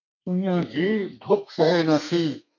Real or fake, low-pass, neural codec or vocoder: fake; 7.2 kHz; codec, 32 kHz, 1.9 kbps, SNAC